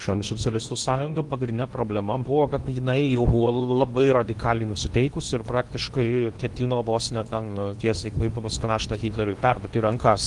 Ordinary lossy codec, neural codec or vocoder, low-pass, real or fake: Opus, 24 kbps; codec, 16 kHz in and 24 kHz out, 0.8 kbps, FocalCodec, streaming, 65536 codes; 10.8 kHz; fake